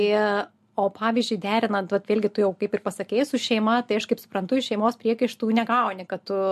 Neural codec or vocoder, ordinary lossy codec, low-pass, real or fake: none; MP3, 64 kbps; 14.4 kHz; real